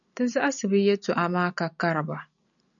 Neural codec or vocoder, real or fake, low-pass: none; real; 7.2 kHz